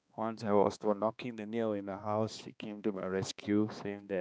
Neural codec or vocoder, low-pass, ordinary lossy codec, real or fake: codec, 16 kHz, 2 kbps, X-Codec, HuBERT features, trained on balanced general audio; none; none; fake